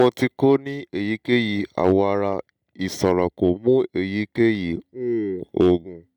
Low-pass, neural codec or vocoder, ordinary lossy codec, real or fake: 19.8 kHz; none; none; real